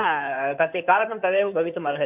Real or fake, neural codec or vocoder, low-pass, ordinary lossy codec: fake; codec, 16 kHz in and 24 kHz out, 2.2 kbps, FireRedTTS-2 codec; 3.6 kHz; none